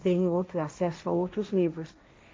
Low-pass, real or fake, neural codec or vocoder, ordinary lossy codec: none; fake; codec, 16 kHz, 1.1 kbps, Voila-Tokenizer; none